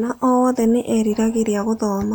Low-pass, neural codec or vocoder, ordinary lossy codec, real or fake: none; none; none; real